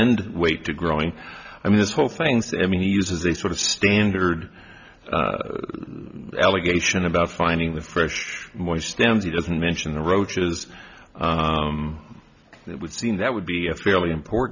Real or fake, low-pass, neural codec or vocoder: real; 7.2 kHz; none